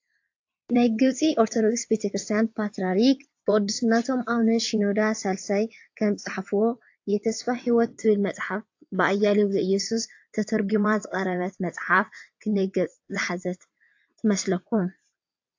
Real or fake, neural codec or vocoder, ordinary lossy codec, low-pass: fake; vocoder, 22.05 kHz, 80 mel bands, WaveNeXt; AAC, 48 kbps; 7.2 kHz